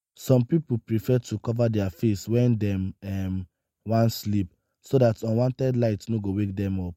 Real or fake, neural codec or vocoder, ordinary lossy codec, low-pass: real; none; MP3, 64 kbps; 19.8 kHz